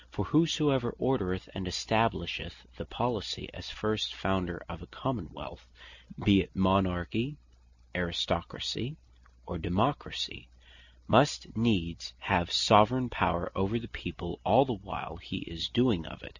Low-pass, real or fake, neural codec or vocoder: 7.2 kHz; real; none